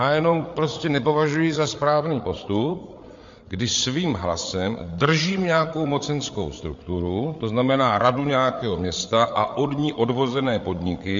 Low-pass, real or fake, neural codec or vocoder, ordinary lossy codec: 7.2 kHz; fake; codec, 16 kHz, 8 kbps, FreqCodec, larger model; MP3, 48 kbps